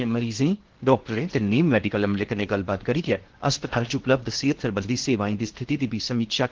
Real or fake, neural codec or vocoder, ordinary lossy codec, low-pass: fake; codec, 16 kHz in and 24 kHz out, 0.8 kbps, FocalCodec, streaming, 65536 codes; Opus, 16 kbps; 7.2 kHz